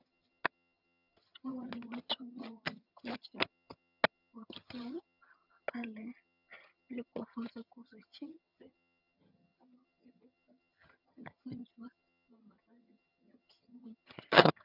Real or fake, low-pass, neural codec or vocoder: fake; 5.4 kHz; vocoder, 22.05 kHz, 80 mel bands, HiFi-GAN